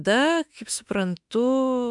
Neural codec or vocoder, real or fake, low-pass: autoencoder, 48 kHz, 32 numbers a frame, DAC-VAE, trained on Japanese speech; fake; 10.8 kHz